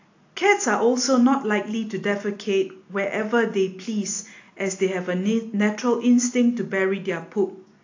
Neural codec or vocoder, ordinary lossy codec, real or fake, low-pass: none; AAC, 48 kbps; real; 7.2 kHz